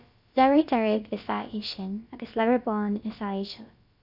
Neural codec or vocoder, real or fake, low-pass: codec, 16 kHz, about 1 kbps, DyCAST, with the encoder's durations; fake; 5.4 kHz